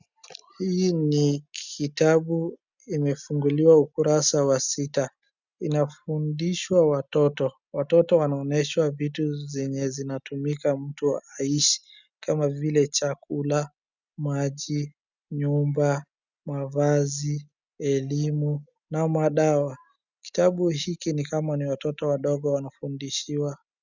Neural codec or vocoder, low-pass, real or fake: none; 7.2 kHz; real